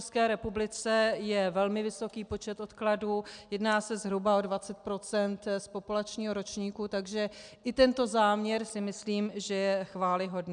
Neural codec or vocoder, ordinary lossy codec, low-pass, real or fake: none; MP3, 96 kbps; 9.9 kHz; real